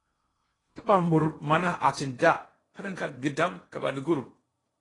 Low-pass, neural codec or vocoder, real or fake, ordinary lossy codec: 10.8 kHz; codec, 16 kHz in and 24 kHz out, 0.8 kbps, FocalCodec, streaming, 65536 codes; fake; AAC, 32 kbps